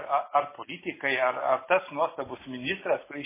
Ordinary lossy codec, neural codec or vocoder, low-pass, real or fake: MP3, 16 kbps; none; 3.6 kHz; real